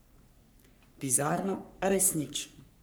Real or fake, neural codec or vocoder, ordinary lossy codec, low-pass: fake; codec, 44.1 kHz, 3.4 kbps, Pupu-Codec; none; none